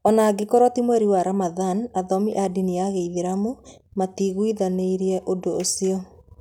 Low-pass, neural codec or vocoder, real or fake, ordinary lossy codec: 19.8 kHz; none; real; none